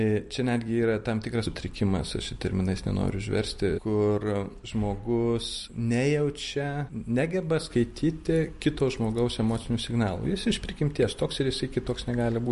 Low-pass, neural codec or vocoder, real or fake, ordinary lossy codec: 14.4 kHz; vocoder, 44.1 kHz, 128 mel bands every 256 samples, BigVGAN v2; fake; MP3, 48 kbps